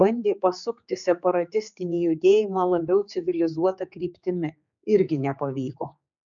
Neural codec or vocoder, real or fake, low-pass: codec, 16 kHz, 4 kbps, X-Codec, HuBERT features, trained on general audio; fake; 7.2 kHz